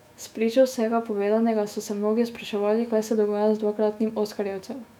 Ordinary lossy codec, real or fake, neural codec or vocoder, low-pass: none; fake; autoencoder, 48 kHz, 128 numbers a frame, DAC-VAE, trained on Japanese speech; 19.8 kHz